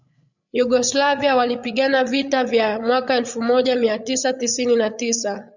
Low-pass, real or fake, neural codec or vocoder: 7.2 kHz; fake; codec, 16 kHz, 8 kbps, FreqCodec, larger model